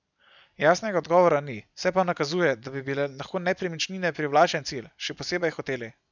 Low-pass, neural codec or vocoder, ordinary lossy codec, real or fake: 7.2 kHz; none; none; real